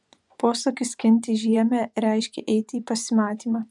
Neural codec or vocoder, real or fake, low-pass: vocoder, 24 kHz, 100 mel bands, Vocos; fake; 10.8 kHz